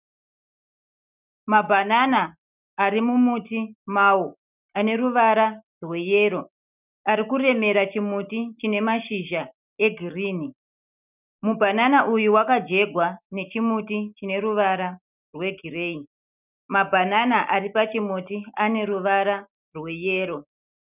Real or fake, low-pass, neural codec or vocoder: real; 3.6 kHz; none